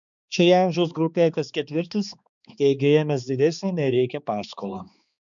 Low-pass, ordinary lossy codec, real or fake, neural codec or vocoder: 7.2 kHz; MP3, 96 kbps; fake; codec, 16 kHz, 2 kbps, X-Codec, HuBERT features, trained on balanced general audio